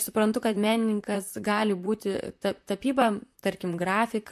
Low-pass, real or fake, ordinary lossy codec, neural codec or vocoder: 14.4 kHz; fake; MP3, 64 kbps; vocoder, 44.1 kHz, 128 mel bands, Pupu-Vocoder